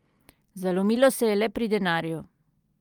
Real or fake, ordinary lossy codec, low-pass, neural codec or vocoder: real; Opus, 32 kbps; 19.8 kHz; none